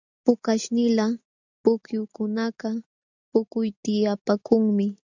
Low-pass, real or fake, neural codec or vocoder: 7.2 kHz; real; none